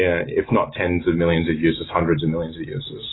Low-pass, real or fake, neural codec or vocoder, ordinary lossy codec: 7.2 kHz; real; none; AAC, 16 kbps